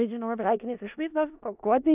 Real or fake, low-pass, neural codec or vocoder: fake; 3.6 kHz; codec, 16 kHz in and 24 kHz out, 0.4 kbps, LongCat-Audio-Codec, four codebook decoder